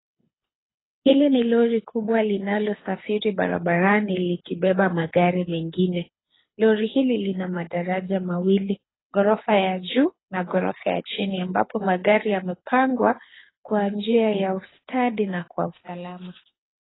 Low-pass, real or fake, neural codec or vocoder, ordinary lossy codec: 7.2 kHz; fake; codec, 24 kHz, 6 kbps, HILCodec; AAC, 16 kbps